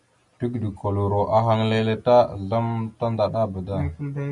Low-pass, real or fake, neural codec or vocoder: 10.8 kHz; real; none